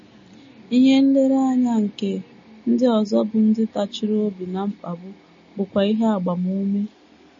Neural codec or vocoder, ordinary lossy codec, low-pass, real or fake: none; MP3, 32 kbps; 7.2 kHz; real